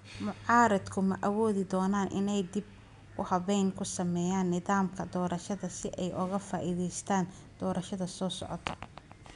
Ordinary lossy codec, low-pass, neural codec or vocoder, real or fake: none; 10.8 kHz; none; real